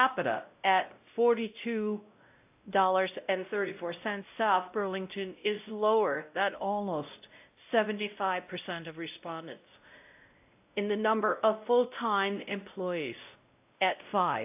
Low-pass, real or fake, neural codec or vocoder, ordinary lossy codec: 3.6 kHz; fake; codec, 16 kHz, 0.5 kbps, X-Codec, WavLM features, trained on Multilingual LibriSpeech; AAC, 32 kbps